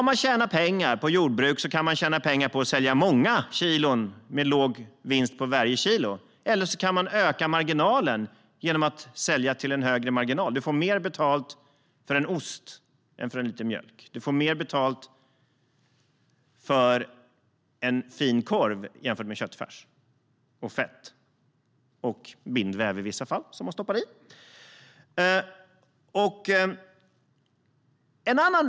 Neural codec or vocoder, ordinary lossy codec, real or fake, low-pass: none; none; real; none